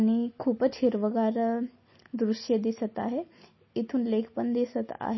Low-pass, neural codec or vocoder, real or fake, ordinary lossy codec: 7.2 kHz; none; real; MP3, 24 kbps